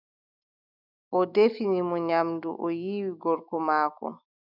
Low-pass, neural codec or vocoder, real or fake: 5.4 kHz; autoencoder, 48 kHz, 128 numbers a frame, DAC-VAE, trained on Japanese speech; fake